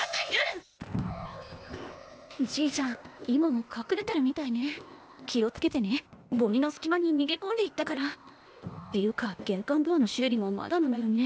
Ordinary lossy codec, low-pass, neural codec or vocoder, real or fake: none; none; codec, 16 kHz, 0.8 kbps, ZipCodec; fake